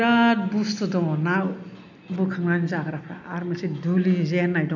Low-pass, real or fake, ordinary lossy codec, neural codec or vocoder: 7.2 kHz; real; none; none